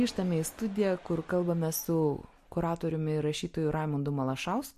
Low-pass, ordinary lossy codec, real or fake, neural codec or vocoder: 14.4 kHz; MP3, 64 kbps; real; none